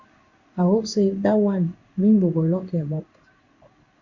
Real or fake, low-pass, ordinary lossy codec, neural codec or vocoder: fake; 7.2 kHz; Opus, 64 kbps; codec, 16 kHz in and 24 kHz out, 1 kbps, XY-Tokenizer